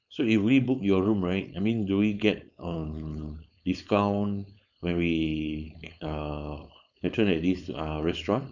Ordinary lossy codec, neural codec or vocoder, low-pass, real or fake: none; codec, 16 kHz, 4.8 kbps, FACodec; 7.2 kHz; fake